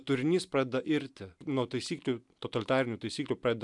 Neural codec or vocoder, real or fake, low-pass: none; real; 10.8 kHz